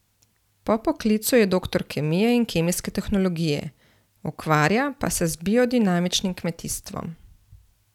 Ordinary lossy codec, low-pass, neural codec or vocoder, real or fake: none; 19.8 kHz; none; real